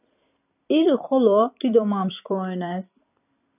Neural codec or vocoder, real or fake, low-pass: vocoder, 44.1 kHz, 128 mel bands every 256 samples, BigVGAN v2; fake; 3.6 kHz